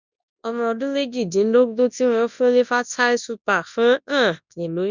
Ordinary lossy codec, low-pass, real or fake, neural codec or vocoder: none; 7.2 kHz; fake; codec, 24 kHz, 0.9 kbps, WavTokenizer, large speech release